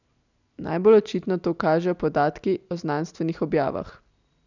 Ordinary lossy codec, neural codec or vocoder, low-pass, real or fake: none; none; 7.2 kHz; real